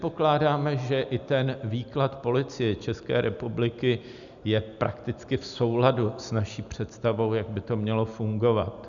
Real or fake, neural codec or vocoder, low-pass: real; none; 7.2 kHz